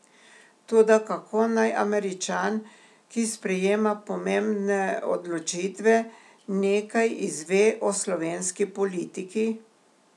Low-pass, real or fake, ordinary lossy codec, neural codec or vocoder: none; real; none; none